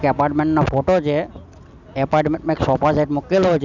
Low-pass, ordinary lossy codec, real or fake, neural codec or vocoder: 7.2 kHz; none; real; none